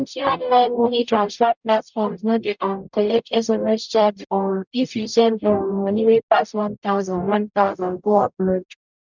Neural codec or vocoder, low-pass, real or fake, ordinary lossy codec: codec, 44.1 kHz, 0.9 kbps, DAC; 7.2 kHz; fake; none